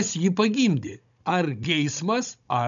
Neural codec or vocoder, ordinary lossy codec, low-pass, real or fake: codec, 16 kHz, 16 kbps, FunCodec, trained on Chinese and English, 50 frames a second; MP3, 96 kbps; 7.2 kHz; fake